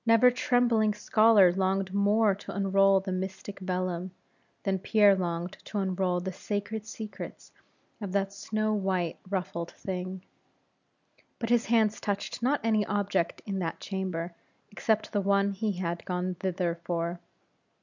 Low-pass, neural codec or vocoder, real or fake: 7.2 kHz; none; real